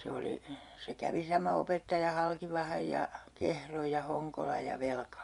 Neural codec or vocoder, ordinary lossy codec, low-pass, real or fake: vocoder, 24 kHz, 100 mel bands, Vocos; none; 10.8 kHz; fake